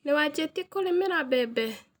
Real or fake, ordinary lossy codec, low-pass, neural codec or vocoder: real; none; none; none